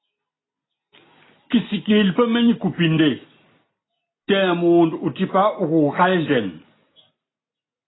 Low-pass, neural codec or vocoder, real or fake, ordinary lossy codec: 7.2 kHz; none; real; AAC, 16 kbps